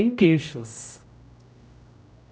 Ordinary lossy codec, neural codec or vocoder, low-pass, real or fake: none; codec, 16 kHz, 0.5 kbps, X-Codec, HuBERT features, trained on general audio; none; fake